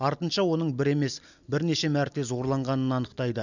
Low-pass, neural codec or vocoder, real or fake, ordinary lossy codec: 7.2 kHz; none; real; none